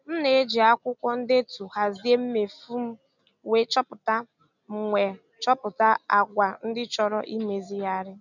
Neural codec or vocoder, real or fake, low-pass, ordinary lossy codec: none; real; 7.2 kHz; none